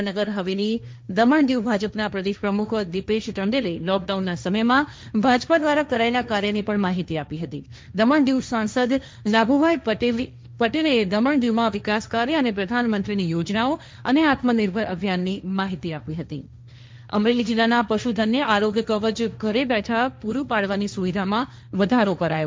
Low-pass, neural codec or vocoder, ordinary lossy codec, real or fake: none; codec, 16 kHz, 1.1 kbps, Voila-Tokenizer; none; fake